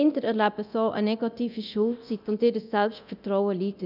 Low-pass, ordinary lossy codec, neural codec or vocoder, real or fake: 5.4 kHz; none; codec, 24 kHz, 0.9 kbps, DualCodec; fake